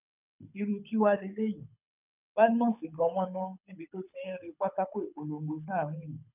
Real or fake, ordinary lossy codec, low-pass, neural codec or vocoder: fake; none; 3.6 kHz; codec, 24 kHz, 6 kbps, HILCodec